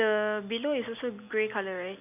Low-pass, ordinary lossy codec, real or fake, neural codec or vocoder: 3.6 kHz; none; real; none